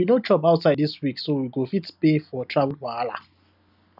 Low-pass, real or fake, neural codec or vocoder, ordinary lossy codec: 5.4 kHz; real; none; none